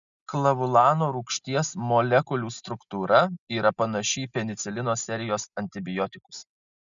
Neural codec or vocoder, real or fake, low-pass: none; real; 7.2 kHz